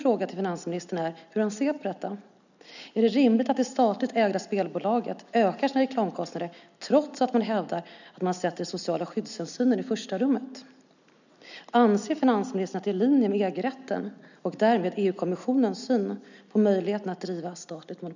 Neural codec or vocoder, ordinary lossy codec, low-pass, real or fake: none; none; 7.2 kHz; real